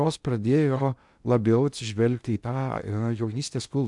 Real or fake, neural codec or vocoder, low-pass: fake; codec, 16 kHz in and 24 kHz out, 0.6 kbps, FocalCodec, streaming, 2048 codes; 10.8 kHz